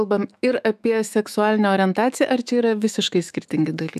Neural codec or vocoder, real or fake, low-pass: autoencoder, 48 kHz, 128 numbers a frame, DAC-VAE, trained on Japanese speech; fake; 14.4 kHz